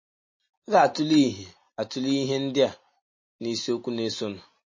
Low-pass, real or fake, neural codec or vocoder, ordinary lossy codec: 7.2 kHz; real; none; MP3, 32 kbps